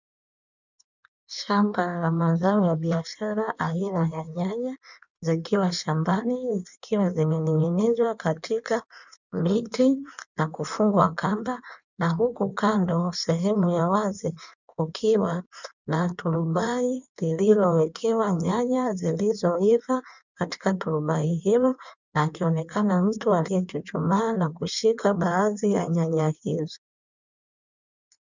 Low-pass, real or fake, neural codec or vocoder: 7.2 kHz; fake; codec, 16 kHz in and 24 kHz out, 1.1 kbps, FireRedTTS-2 codec